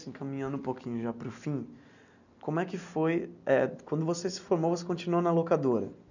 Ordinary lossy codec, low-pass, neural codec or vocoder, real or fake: none; 7.2 kHz; none; real